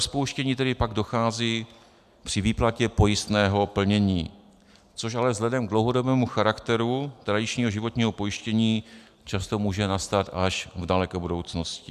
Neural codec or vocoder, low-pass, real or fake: none; 14.4 kHz; real